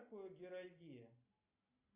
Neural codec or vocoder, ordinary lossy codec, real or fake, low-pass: none; AAC, 32 kbps; real; 3.6 kHz